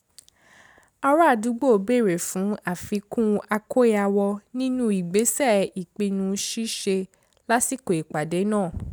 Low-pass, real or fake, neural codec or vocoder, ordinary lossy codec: none; real; none; none